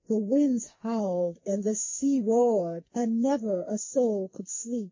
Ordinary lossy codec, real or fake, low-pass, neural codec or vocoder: MP3, 32 kbps; fake; 7.2 kHz; codec, 44.1 kHz, 2.6 kbps, SNAC